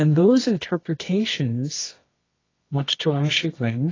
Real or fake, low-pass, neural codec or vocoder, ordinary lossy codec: fake; 7.2 kHz; codec, 24 kHz, 0.9 kbps, WavTokenizer, medium music audio release; AAC, 32 kbps